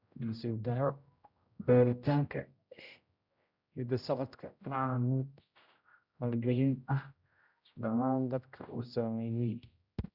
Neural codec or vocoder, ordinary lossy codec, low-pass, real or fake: codec, 16 kHz, 0.5 kbps, X-Codec, HuBERT features, trained on general audio; Opus, 64 kbps; 5.4 kHz; fake